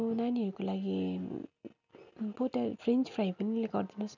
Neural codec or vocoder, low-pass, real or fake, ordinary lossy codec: none; 7.2 kHz; real; none